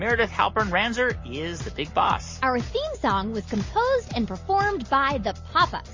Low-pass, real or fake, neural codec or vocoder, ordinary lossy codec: 7.2 kHz; real; none; MP3, 32 kbps